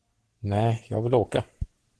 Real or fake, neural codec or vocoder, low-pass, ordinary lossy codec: fake; codec, 44.1 kHz, 7.8 kbps, Pupu-Codec; 10.8 kHz; Opus, 16 kbps